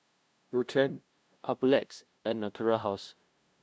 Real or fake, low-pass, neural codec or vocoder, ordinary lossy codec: fake; none; codec, 16 kHz, 0.5 kbps, FunCodec, trained on LibriTTS, 25 frames a second; none